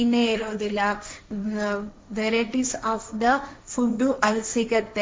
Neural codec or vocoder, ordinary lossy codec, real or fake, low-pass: codec, 16 kHz, 1.1 kbps, Voila-Tokenizer; none; fake; none